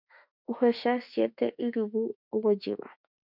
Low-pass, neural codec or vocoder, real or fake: 5.4 kHz; autoencoder, 48 kHz, 32 numbers a frame, DAC-VAE, trained on Japanese speech; fake